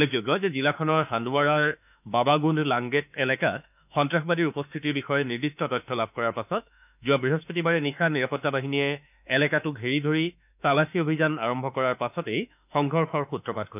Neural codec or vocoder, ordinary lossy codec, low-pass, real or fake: autoencoder, 48 kHz, 32 numbers a frame, DAC-VAE, trained on Japanese speech; none; 3.6 kHz; fake